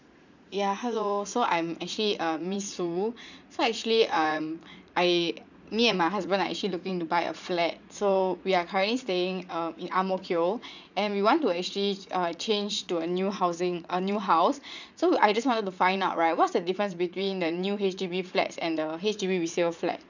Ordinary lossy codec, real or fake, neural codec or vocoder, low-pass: none; fake; vocoder, 44.1 kHz, 80 mel bands, Vocos; 7.2 kHz